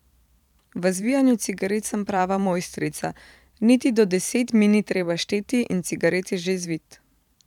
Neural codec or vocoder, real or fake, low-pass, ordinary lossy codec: vocoder, 44.1 kHz, 128 mel bands every 512 samples, BigVGAN v2; fake; 19.8 kHz; none